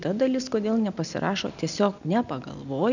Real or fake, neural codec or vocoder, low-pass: real; none; 7.2 kHz